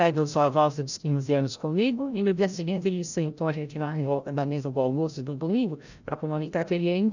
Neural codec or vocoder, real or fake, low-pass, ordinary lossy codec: codec, 16 kHz, 0.5 kbps, FreqCodec, larger model; fake; 7.2 kHz; none